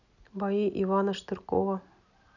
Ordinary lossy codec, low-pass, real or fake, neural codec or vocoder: none; 7.2 kHz; real; none